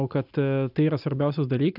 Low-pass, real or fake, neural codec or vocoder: 5.4 kHz; real; none